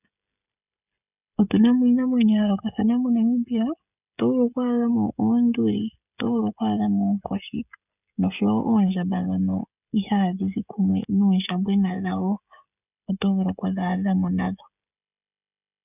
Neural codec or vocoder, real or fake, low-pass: codec, 16 kHz, 16 kbps, FreqCodec, smaller model; fake; 3.6 kHz